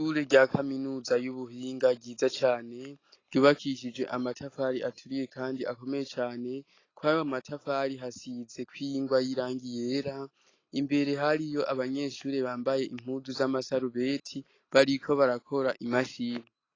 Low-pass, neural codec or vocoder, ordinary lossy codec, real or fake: 7.2 kHz; none; AAC, 32 kbps; real